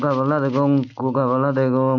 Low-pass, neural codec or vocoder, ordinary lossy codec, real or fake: 7.2 kHz; none; MP3, 48 kbps; real